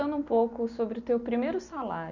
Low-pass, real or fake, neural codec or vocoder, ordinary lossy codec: 7.2 kHz; real; none; none